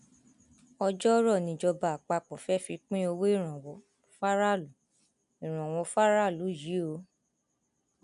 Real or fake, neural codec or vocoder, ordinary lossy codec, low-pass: real; none; none; 10.8 kHz